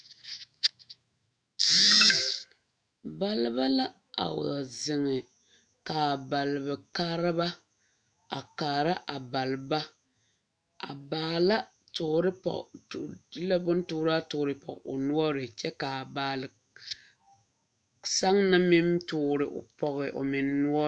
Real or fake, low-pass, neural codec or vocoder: fake; 9.9 kHz; autoencoder, 48 kHz, 128 numbers a frame, DAC-VAE, trained on Japanese speech